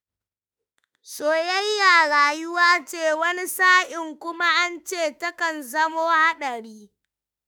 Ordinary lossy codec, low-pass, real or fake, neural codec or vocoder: none; none; fake; autoencoder, 48 kHz, 32 numbers a frame, DAC-VAE, trained on Japanese speech